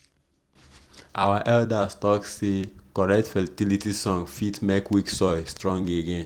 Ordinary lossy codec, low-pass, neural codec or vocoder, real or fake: Opus, 24 kbps; 19.8 kHz; vocoder, 44.1 kHz, 128 mel bands every 256 samples, BigVGAN v2; fake